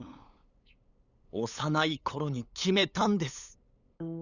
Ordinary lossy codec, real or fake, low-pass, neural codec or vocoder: none; fake; 7.2 kHz; codec, 16 kHz, 8 kbps, FunCodec, trained on LibriTTS, 25 frames a second